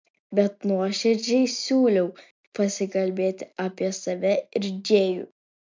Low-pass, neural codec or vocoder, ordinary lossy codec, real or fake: 7.2 kHz; none; MP3, 64 kbps; real